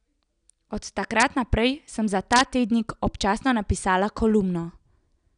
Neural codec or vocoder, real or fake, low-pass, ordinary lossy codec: none; real; 9.9 kHz; none